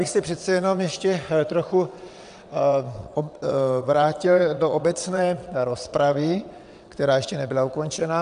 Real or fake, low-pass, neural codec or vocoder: fake; 9.9 kHz; vocoder, 22.05 kHz, 80 mel bands, WaveNeXt